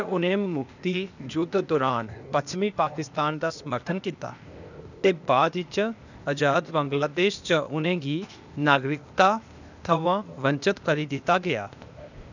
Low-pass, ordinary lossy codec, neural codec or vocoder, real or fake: 7.2 kHz; none; codec, 16 kHz, 0.8 kbps, ZipCodec; fake